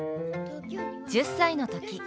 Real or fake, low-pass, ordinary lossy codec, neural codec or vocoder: real; none; none; none